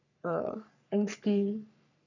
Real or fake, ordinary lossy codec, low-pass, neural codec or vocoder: fake; none; 7.2 kHz; codec, 44.1 kHz, 3.4 kbps, Pupu-Codec